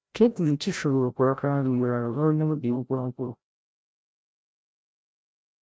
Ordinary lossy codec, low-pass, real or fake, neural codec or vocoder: none; none; fake; codec, 16 kHz, 0.5 kbps, FreqCodec, larger model